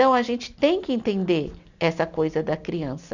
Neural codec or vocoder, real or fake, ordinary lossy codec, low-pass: none; real; none; 7.2 kHz